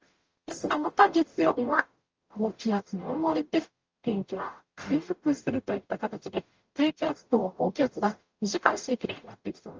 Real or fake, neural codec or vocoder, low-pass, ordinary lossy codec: fake; codec, 44.1 kHz, 0.9 kbps, DAC; 7.2 kHz; Opus, 24 kbps